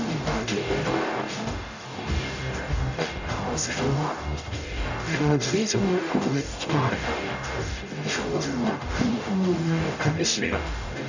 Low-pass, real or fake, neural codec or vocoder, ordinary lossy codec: 7.2 kHz; fake; codec, 44.1 kHz, 0.9 kbps, DAC; none